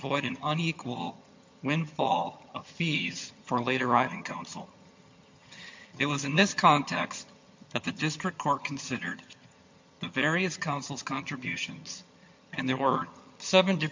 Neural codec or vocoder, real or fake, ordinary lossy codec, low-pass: vocoder, 22.05 kHz, 80 mel bands, HiFi-GAN; fake; MP3, 48 kbps; 7.2 kHz